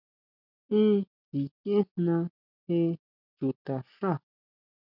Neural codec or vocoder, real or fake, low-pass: none; real; 5.4 kHz